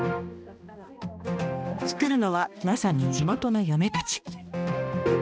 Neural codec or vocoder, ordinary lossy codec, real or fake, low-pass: codec, 16 kHz, 1 kbps, X-Codec, HuBERT features, trained on balanced general audio; none; fake; none